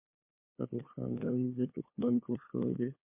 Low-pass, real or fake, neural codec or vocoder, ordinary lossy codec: 3.6 kHz; fake; codec, 16 kHz, 2 kbps, FunCodec, trained on LibriTTS, 25 frames a second; MP3, 24 kbps